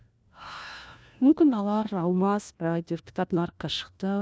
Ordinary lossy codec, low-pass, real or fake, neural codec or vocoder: none; none; fake; codec, 16 kHz, 1 kbps, FunCodec, trained on LibriTTS, 50 frames a second